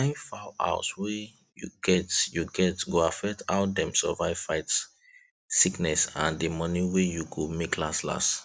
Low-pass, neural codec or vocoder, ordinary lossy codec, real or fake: none; none; none; real